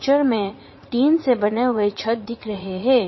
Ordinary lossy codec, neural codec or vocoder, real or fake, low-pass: MP3, 24 kbps; vocoder, 44.1 kHz, 80 mel bands, Vocos; fake; 7.2 kHz